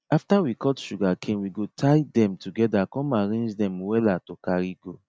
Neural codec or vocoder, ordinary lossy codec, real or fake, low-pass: none; none; real; none